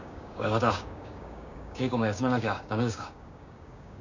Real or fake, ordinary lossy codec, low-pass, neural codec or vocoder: fake; none; 7.2 kHz; codec, 16 kHz, 6 kbps, DAC